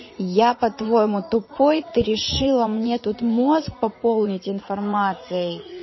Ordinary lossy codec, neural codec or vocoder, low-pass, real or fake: MP3, 24 kbps; vocoder, 44.1 kHz, 80 mel bands, Vocos; 7.2 kHz; fake